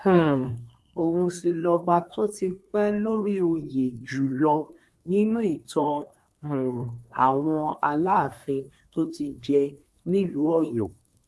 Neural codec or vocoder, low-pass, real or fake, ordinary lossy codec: codec, 24 kHz, 1 kbps, SNAC; none; fake; none